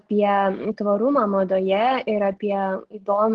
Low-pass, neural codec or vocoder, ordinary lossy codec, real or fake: 10.8 kHz; none; Opus, 16 kbps; real